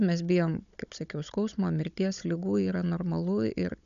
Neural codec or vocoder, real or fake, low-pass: codec, 16 kHz, 16 kbps, FunCodec, trained on Chinese and English, 50 frames a second; fake; 7.2 kHz